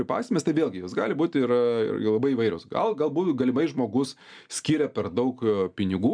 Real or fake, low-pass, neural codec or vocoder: real; 9.9 kHz; none